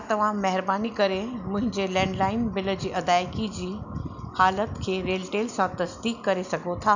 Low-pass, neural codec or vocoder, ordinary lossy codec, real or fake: 7.2 kHz; none; none; real